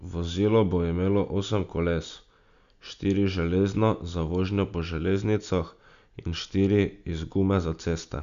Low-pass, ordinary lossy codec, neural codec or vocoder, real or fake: 7.2 kHz; none; none; real